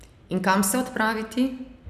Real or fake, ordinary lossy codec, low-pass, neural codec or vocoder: real; none; 14.4 kHz; none